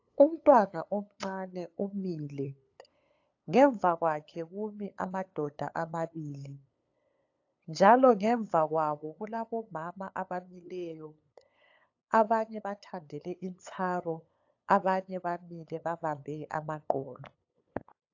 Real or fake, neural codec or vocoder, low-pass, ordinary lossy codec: fake; codec, 16 kHz, 8 kbps, FunCodec, trained on LibriTTS, 25 frames a second; 7.2 kHz; AAC, 48 kbps